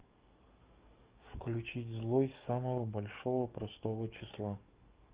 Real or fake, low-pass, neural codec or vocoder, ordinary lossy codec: fake; 3.6 kHz; codec, 44.1 kHz, 7.8 kbps, DAC; Opus, 32 kbps